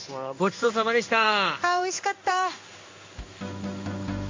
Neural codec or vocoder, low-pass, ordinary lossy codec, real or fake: codec, 16 kHz in and 24 kHz out, 1 kbps, XY-Tokenizer; 7.2 kHz; MP3, 48 kbps; fake